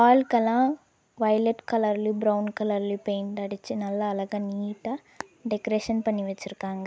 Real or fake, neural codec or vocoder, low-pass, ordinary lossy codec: real; none; none; none